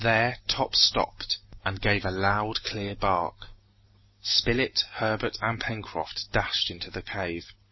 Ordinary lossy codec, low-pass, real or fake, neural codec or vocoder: MP3, 24 kbps; 7.2 kHz; real; none